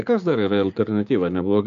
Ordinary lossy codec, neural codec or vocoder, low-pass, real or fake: MP3, 64 kbps; codec, 16 kHz, 6 kbps, DAC; 7.2 kHz; fake